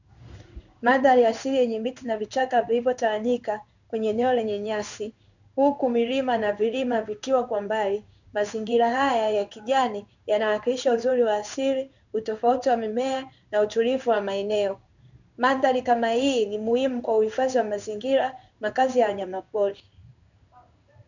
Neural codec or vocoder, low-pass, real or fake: codec, 16 kHz in and 24 kHz out, 1 kbps, XY-Tokenizer; 7.2 kHz; fake